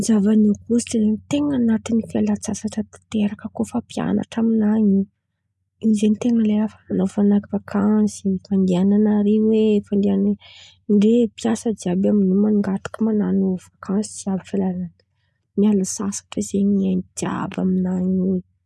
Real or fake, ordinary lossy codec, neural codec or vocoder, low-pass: real; none; none; none